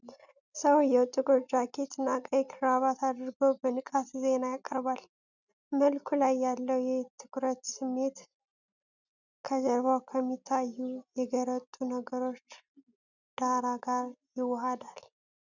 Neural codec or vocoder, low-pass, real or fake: none; 7.2 kHz; real